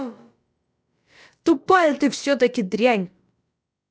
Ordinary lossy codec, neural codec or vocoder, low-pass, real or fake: none; codec, 16 kHz, about 1 kbps, DyCAST, with the encoder's durations; none; fake